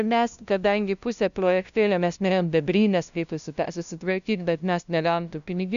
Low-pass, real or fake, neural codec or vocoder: 7.2 kHz; fake; codec, 16 kHz, 0.5 kbps, FunCodec, trained on LibriTTS, 25 frames a second